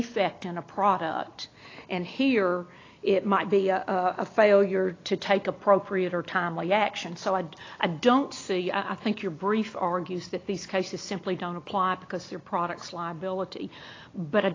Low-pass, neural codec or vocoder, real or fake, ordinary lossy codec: 7.2 kHz; none; real; AAC, 32 kbps